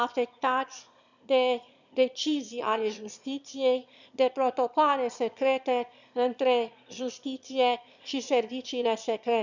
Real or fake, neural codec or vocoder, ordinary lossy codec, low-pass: fake; autoencoder, 22.05 kHz, a latent of 192 numbers a frame, VITS, trained on one speaker; none; 7.2 kHz